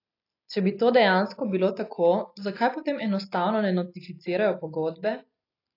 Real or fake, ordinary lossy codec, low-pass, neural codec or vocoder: real; AAC, 32 kbps; 5.4 kHz; none